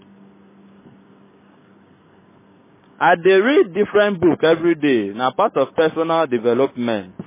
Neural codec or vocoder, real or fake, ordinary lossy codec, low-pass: codec, 16 kHz, 6 kbps, DAC; fake; MP3, 16 kbps; 3.6 kHz